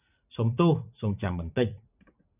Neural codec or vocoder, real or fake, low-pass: vocoder, 44.1 kHz, 80 mel bands, Vocos; fake; 3.6 kHz